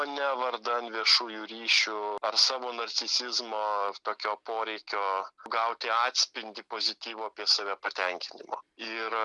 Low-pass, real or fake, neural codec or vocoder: 10.8 kHz; real; none